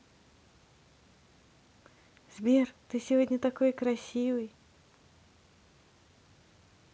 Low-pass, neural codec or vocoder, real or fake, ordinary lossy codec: none; none; real; none